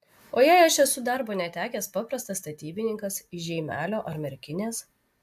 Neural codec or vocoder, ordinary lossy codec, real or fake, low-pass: none; AAC, 96 kbps; real; 14.4 kHz